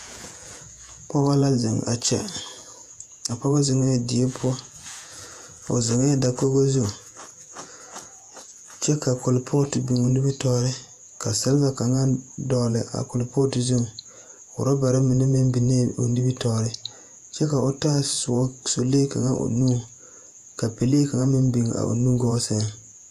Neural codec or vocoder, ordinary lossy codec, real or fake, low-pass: vocoder, 48 kHz, 128 mel bands, Vocos; AAC, 96 kbps; fake; 14.4 kHz